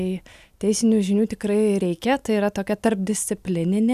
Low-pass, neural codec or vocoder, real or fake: 14.4 kHz; none; real